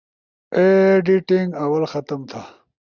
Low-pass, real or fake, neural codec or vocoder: 7.2 kHz; real; none